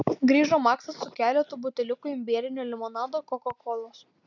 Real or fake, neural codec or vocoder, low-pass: real; none; 7.2 kHz